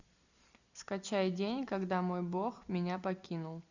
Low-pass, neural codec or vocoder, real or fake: 7.2 kHz; none; real